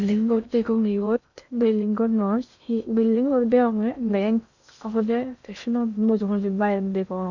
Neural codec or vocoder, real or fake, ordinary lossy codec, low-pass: codec, 16 kHz in and 24 kHz out, 0.6 kbps, FocalCodec, streaming, 2048 codes; fake; none; 7.2 kHz